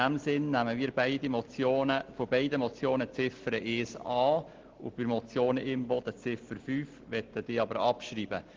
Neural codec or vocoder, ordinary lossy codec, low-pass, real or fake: none; Opus, 16 kbps; 7.2 kHz; real